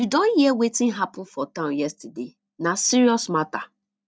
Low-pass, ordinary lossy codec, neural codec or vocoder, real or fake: none; none; none; real